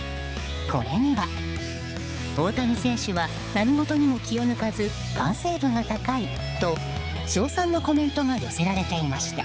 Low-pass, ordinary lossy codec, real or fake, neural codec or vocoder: none; none; fake; codec, 16 kHz, 4 kbps, X-Codec, HuBERT features, trained on balanced general audio